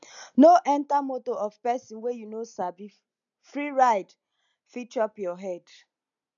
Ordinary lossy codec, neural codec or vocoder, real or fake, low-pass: none; none; real; 7.2 kHz